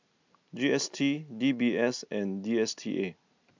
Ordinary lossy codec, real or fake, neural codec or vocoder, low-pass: MP3, 64 kbps; real; none; 7.2 kHz